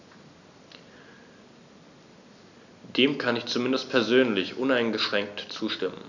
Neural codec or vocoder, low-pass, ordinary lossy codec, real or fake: none; 7.2 kHz; none; real